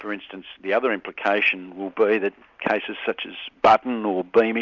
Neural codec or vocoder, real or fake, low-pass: none; real; 7.2 kHz